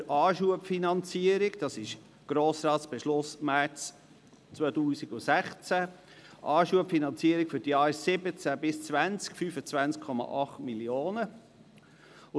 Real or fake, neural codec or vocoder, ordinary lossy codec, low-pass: real; none; none; none